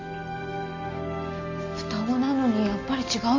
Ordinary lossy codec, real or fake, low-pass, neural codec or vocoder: MP3, 32 kbps; real; 7.2 kHz; none